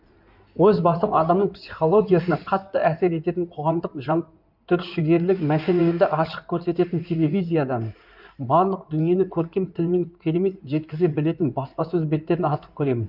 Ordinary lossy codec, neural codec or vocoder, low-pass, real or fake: none; codec, 16 kHz in and 24 kHz out, 2.2 kbps, FireRedTTS-2 codec; 5.4 kHz; fake